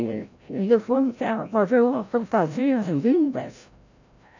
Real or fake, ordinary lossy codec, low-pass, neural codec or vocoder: fake; none; 7.2 kHz; codec, 16 kHz, 0.5 kbps, FreqCodec, larger model